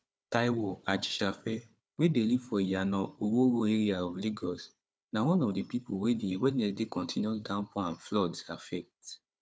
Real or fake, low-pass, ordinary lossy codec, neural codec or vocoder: fake; none; none; codec, 16 kHz, 4 kbps, FunCodec, trained on Chinese and English, 50 frames a second